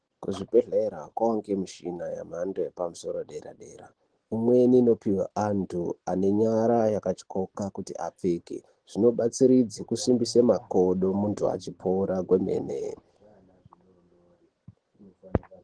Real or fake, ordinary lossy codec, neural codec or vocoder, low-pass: real; Opus, 16 kbps; none; 9.9 kHz